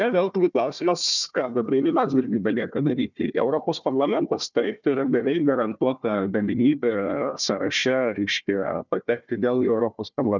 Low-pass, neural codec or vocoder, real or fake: 7.2 kHz; codec, 16 kHz, 1 kbps, FunCodec, trained on Chinese and English, 50 frames a second; fake